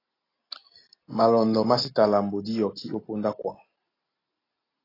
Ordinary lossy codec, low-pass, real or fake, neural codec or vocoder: AAC, 24 kbps; 5.4 kHz; real; none